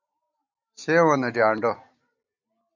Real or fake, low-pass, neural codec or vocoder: real; 7.2 kHz; none